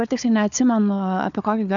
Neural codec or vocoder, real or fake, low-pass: codec, 16 kHz, 4 kbps, FunCodec, trained on Chinese and English, 50 frames a second; fake; 7.2 kHz